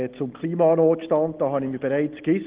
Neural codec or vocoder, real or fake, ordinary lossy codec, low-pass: none; real; Opus, 16 kbps; 3.6 kHz